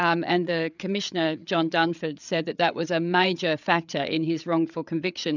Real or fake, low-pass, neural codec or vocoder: fake; 7.2 kHz; codec, 16 kHz, 8 kbps, FreqCodec, larger model